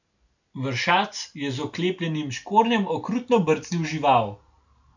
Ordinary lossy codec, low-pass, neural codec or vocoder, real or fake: none; 7.2 kHz; none; real